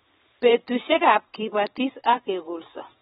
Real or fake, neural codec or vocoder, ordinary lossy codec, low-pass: fake; vocoder, 44.1 kHz, 128 mel bands every 512 samples, BigVGAN v2; AAC, 16 kbps; 19.8 kHz